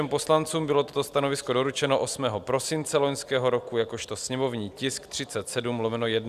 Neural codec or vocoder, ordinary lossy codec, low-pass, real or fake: none; Opus, 64 kbps; 14.4 kHz; real